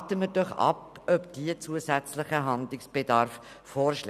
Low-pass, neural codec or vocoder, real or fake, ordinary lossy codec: 14.4 kHz; none; real; none